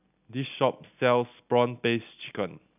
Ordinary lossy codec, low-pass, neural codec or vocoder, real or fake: none; 3.6 kHz; none; real